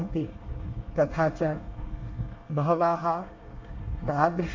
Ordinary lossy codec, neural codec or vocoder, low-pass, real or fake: MP3, 48 kbps; codec, 24 kHz, 1 kbps, SNAC; 7.2 kHz; fake